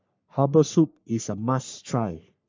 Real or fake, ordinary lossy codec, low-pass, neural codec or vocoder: fake; MP3, 64 kbps; 7.2 kHz; codec, 44.1 kHz, 3.4 kbps, Pupu-Codec